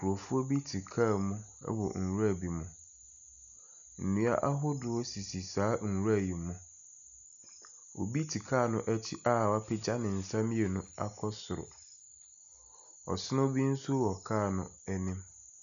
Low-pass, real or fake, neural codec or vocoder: 7.2 kHz; real; none